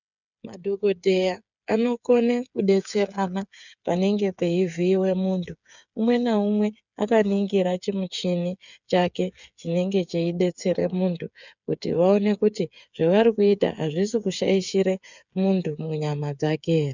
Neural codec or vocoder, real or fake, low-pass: codec, 16 kHz, 8 kbps, FreqCodec, smaller model; fake; 7.2 kHz